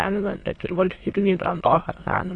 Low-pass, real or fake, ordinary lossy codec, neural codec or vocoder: 9.9 kHz; fake; AAC, 32 kbps; autoencoder, 22.05 kHz, a latent of 192 numbers a frame, VITS, trained on many speakers